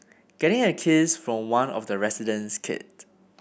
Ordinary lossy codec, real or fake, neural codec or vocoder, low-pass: none; real; none; none